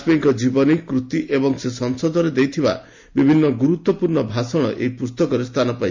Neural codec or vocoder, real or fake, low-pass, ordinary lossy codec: none; real; 7.2 kHz; MP3, 48 kbps